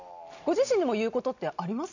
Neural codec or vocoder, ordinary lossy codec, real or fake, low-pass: none; none; real; 7.2 kHz